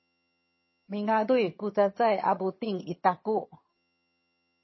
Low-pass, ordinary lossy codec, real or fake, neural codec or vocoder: 7.2 kHz; MP3, 24 kbps; fake; vocoder, 22.05 kHz, 80 mel bands, HiFi-GAN